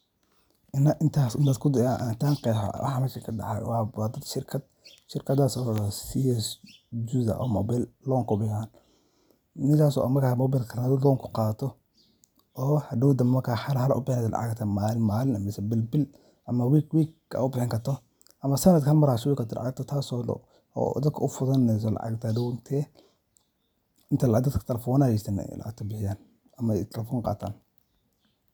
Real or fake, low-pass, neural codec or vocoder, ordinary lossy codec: real; none; none; none